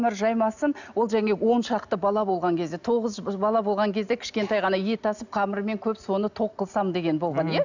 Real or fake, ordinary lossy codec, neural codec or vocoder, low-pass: real; none; none; 7.2 kHz